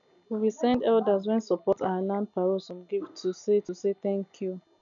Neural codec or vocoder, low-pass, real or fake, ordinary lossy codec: none; 7.2 kHz; real; none